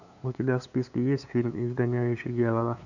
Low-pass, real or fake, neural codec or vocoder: 7.2 kHz; fake; codec, 16 kHz, 2 kbps, FunCodec, trained on LibriTTS, 25 frames a second